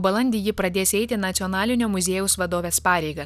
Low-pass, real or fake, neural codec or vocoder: 14.4 kHz; real; none